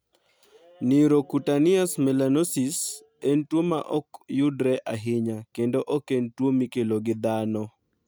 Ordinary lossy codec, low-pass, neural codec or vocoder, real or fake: none; none; none; real